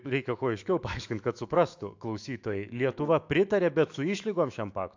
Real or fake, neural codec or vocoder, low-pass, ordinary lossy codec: fake; autoencoder, 48 kHz, 128 numbers a frame, DAC-VAE, trained on Japanese speech; 7.2 kHz; AAC, 48 kbps